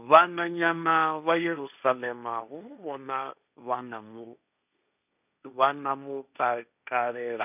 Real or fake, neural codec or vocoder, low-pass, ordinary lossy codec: fake; codec, 16 kHz, 1.1 kbps, Voila-Tokenizer; 3.6 kHz; none